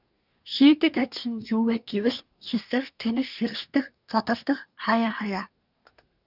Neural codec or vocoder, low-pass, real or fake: codec, 24 kHz, 1 kbps, SNAC; 5.4 kHz; fake